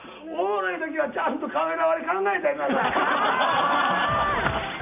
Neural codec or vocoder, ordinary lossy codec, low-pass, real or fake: none; none; 3.6 kHz; real